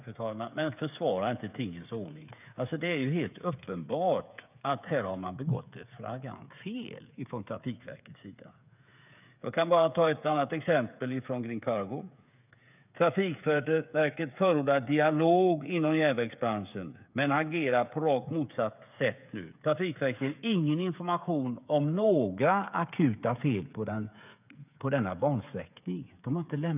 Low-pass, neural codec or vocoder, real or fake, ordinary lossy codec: 3.6 kHz; codec, 16 kHz, 8 kbps, FreqCodec, smaller model; fake; none